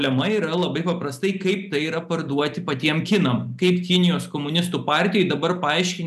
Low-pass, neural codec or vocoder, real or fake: 14.4 kHz; none; real